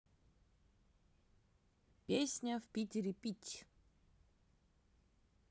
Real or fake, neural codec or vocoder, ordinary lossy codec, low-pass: real; none; none; none